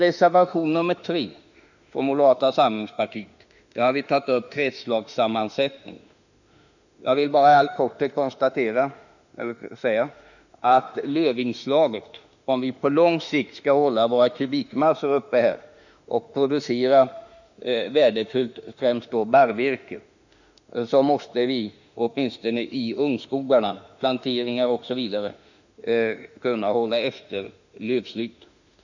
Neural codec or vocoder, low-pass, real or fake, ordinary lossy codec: autoencoder, 48 kHz, 32 numbers a frame, DAC-VAE, trained on Japanese speech; 7.2 kHz; fake; none